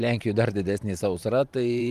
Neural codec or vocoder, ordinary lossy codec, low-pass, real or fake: vocoder, 44.1 kHz, 128 mel bands every 512 samples, BigVGAN v2; Opus, 32 kbps; 14.4 kHz; fake